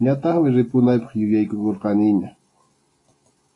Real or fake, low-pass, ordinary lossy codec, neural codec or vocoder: real; 10.8 kHz; AAC, 32 kbps; none